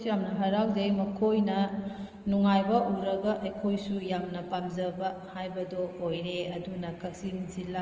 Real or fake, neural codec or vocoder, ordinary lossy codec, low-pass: real; none; Opus, 24 kbps; 7.2 kHz